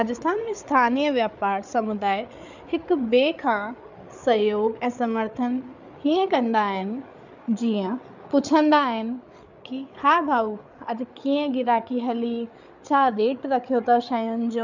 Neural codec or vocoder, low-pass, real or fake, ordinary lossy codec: codec, 16 kHz, 8 kbps, FreqCodec, larger model; 7.2 kHz; fake; none